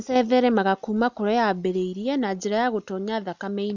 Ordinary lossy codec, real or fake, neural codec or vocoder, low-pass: none; real; none; 7.2 kHz